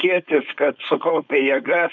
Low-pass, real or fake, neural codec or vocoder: 7.2 kHz; fake; codec, 16 kHz, 4.8 kbps, FACodec